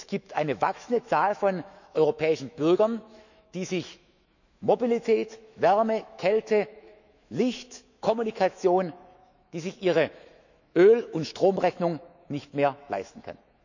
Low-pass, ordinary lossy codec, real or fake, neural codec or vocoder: 7.2 kHz; none; fake; autoencoder, 48 kHz, 128 numbers a frame, DAC-VAE, trained on Japanese speech